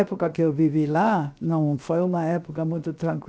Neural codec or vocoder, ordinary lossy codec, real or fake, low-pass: codec, 16 kHz, 0.7 kbps, FocalCodec; none; fake; none